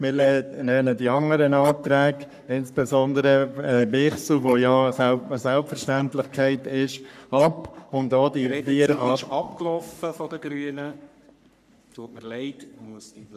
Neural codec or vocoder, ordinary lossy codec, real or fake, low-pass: codec, 44.1 kHz, 3.4 kbps, Pupu-Codec; none; fake; 14.4 kHz